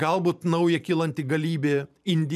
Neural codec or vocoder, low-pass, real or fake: vocoder, 44.1 kHz, 128 mel bands every 512 samples, BigVGAN v2; 14.4 kHz; fake